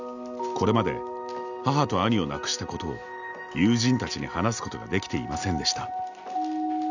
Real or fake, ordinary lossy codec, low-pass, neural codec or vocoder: real; none; 7.2 kHz; none